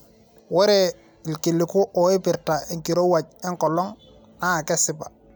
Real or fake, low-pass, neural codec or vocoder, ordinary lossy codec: real; none; none; none